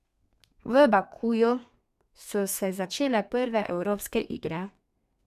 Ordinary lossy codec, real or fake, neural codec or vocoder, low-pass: none; fake; codec, 32 kHz, 1.9 kbps, SNAC; 14.4 kHz